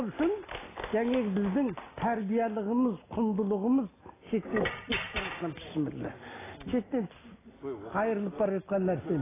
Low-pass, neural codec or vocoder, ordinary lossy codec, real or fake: 3.6 kHz; none; AAC, 16 kbps; real